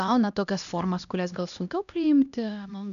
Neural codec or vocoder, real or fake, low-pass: codec, 16 kHz, 1 kbps, X-Codec, HuBERT features, trained on LibriSpeech; fake; 7.2 kHz